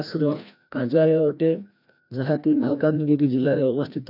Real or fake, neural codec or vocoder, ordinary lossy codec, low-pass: fake; codec, 16 kHz, 1 kbps, FreqCodec, larger model; none; 5.4 kHz